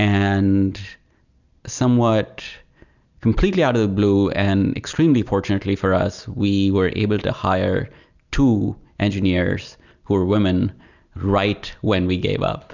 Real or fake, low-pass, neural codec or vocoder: real; 7.2 kHz; none